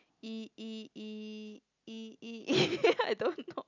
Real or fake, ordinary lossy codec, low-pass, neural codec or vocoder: real; none; 7.2 kHz; none